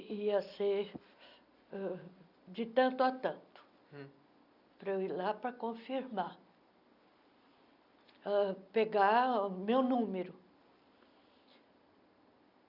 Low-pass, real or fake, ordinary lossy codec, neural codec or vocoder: 5.4 kHz; real; none; none